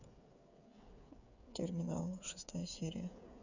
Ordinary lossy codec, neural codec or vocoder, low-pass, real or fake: none; codec, 16 kHz, 8 kbps, FreqCodec, smaller model; 7.2 kHz; fake